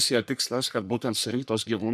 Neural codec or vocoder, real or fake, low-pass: codec, 44.1 kHz, 3.4 kbps, Pupu-Codec; fake; 14.4 kHz